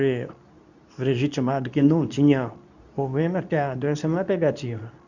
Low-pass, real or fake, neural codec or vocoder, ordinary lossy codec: 7.2 kHz; fake; codec, 24 kHz, 0.9 kbps, WavTokenizer, medium speech release version 2; none